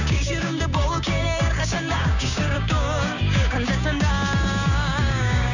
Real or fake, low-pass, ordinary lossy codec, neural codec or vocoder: fake; 7.2 kHz; none; codec, 16 kHz, 6 kbps, DAC